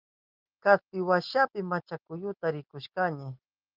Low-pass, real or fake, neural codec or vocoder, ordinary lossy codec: 5.4 kHz; real; none; Opus, 32 kbps